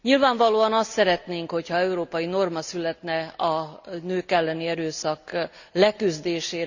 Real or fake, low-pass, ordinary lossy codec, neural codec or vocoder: real; 7.2 kHz; Opus, 64 kbps; none